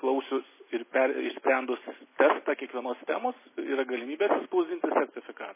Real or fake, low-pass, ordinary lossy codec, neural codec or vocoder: real; 3.6 kHz; MP3, 16 kbps; none